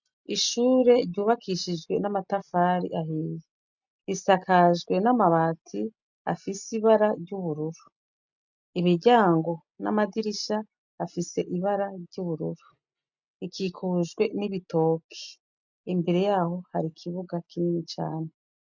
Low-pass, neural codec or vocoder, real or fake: 7.2 kHz; none; real